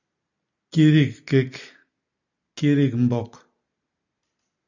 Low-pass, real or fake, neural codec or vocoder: 7.2 kHz; real; none